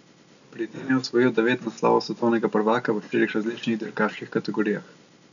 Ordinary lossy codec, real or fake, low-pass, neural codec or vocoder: none; real; 7.2 kHz; none